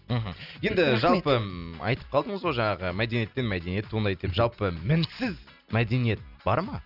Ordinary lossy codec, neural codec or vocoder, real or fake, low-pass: none; none; real; 5.4 kHz